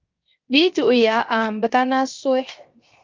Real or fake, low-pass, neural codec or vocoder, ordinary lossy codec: fake; 7.2 kHz; codec, 16 kHz, 0.7 kbps, FocalCodec; Opus, 24 kbps